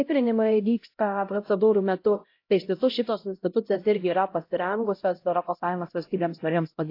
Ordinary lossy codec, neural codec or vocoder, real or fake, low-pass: AAC, 32 kbps; codec, 16 kHz, 0.5 kbps, X-Codec, HuBERT features, trained on LibriSpeech; fake; 5.4 kHz